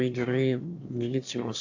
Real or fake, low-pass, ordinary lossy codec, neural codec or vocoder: fake; 7.2 kHz; AAC, 48 kbps; autoencoder, 22.05 kHz, a latent of 192 numbers a frame, VITS, trained on one speaker